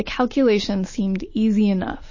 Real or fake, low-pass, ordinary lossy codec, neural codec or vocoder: real; 7.2 kHz; MP3, 32 kbps; none